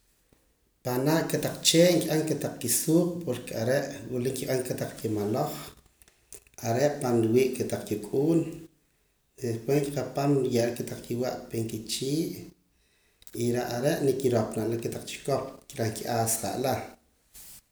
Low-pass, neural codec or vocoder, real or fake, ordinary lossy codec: none; none; real; none